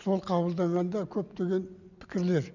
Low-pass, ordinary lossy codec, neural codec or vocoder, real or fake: 7.2 kHz; none; none; real